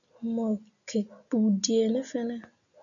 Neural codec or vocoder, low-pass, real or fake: none; 7.2 kHz; real